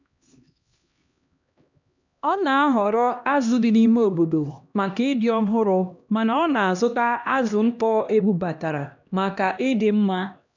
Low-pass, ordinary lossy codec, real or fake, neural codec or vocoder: 7.2 kHz; none; fake; codec, 16 kHz, 1 kbps, X-Codec, HuBERT features, trained on LibriSpeech